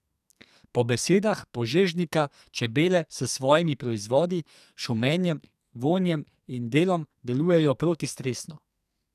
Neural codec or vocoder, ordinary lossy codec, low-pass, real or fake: codec, 44.1 kHz, 2.6 kbps, SNAC; none; 14.4 kHz; fake